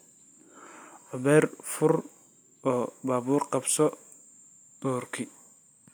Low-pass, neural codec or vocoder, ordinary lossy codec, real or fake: none; none; none; real